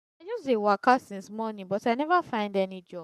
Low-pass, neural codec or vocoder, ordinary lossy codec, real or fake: 14.4 kHz; codec, 44.1 kHz, 7.8 kbps, Pupu-Codec; none; fake